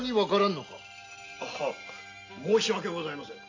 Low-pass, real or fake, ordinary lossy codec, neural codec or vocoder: 7.2 kHz; real; AAC, 48 kbps; none